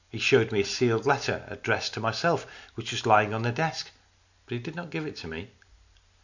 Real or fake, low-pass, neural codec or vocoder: real; 7.2 kHz; none